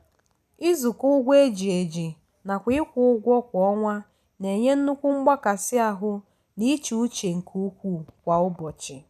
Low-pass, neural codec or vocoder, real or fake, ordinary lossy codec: 14.4 kHz; none; real; none